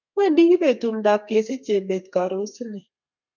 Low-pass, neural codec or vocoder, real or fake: 7.2 kHz; codec, 32 kHz, 1.9 kbps, SNAC; fake